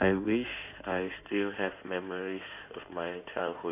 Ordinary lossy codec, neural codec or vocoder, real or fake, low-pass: none; codec, 16 kHz in and 24 kHz out, 2.2 kbps, FireRedTTS-2 codec; fake; 3.6 kHz